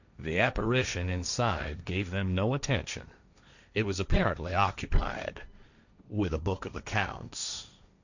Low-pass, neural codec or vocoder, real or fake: 7.2 kHz; codec, 16 kHz, 1.1 kbps, Voila-Tokenizer; fake